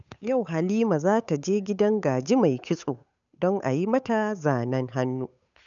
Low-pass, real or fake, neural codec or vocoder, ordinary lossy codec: 7.2 kHz; fake; codec, 16 kHz, 8 kbps, FunCodec, trained on Chinese and English, 25 frames a second; none